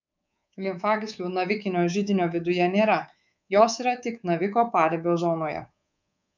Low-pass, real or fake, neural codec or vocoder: 7.2 kHz; fake; codec, 24 kHz, 3.1 kbps, DualCodec